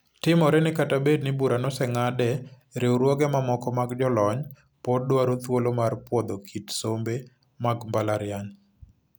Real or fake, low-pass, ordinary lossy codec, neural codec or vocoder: real; none; none; none